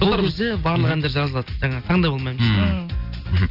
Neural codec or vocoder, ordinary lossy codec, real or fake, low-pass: none; none; real; 5.4 kHz